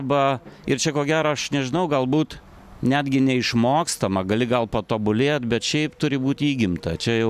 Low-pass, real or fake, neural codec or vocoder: 14.4 kHz; real; none